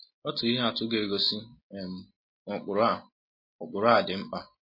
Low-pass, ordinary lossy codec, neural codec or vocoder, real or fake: 5.4 kHz; MP3, 24 kbps; none; real